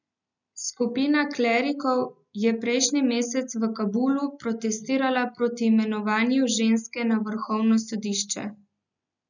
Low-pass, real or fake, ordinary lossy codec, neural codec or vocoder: 7.2 kHz; real; none; none